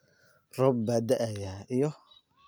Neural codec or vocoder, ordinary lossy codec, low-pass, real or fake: none; none; none; real